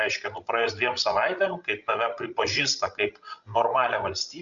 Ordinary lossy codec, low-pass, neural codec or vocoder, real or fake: MP3, 96 kbps; 7.2 kHz; codec, 16 kHz, 16 kbps, FreqCodec, larger model; fake